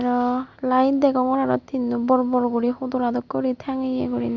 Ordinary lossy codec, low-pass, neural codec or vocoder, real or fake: Opus, 64 kbps; 7.2 kHz; none; real